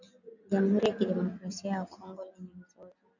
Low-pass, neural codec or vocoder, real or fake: 7.2 kHz; none; real